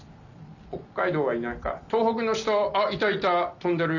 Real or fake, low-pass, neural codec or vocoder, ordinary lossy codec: real; 7.2 kHz; none; none